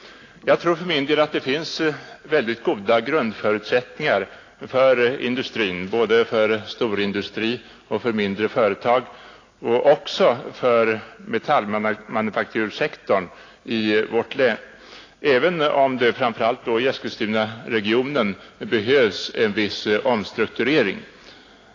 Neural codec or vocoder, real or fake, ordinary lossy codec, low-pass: none; real; AAC, 32 kbps; 7.2 kHz